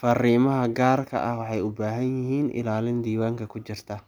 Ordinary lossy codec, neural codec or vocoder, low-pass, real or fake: none; none; none; real